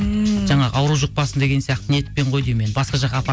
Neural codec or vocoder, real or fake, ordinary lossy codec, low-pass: none; real; none; none